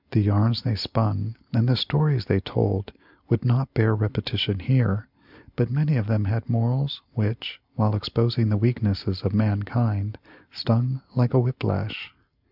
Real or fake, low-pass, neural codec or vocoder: real; 5.4 kHz; none